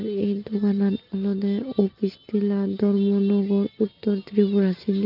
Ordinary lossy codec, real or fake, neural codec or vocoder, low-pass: Opus, 32 kbps; real; none; 5.4 kHz